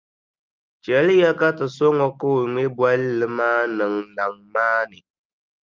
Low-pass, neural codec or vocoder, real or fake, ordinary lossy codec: 7.2 kHz; none; real; Opus, 24 kbps